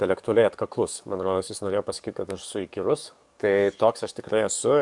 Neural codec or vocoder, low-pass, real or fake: codec, 44.1 kHz, 7.8 kbps, DAC; 10.8 kHz; fake